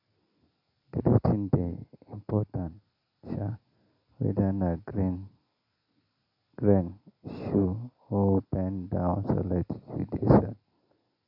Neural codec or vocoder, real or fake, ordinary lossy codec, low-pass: none; real; none; 5.4 kHz